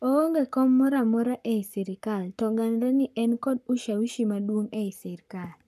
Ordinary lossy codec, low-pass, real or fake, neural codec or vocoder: none; 14.4 kHz; fake; codec, 44.1 kHz, 7.8 kbps, Pupu-Codec